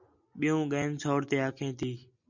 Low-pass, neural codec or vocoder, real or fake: 7.2 kHz; none; real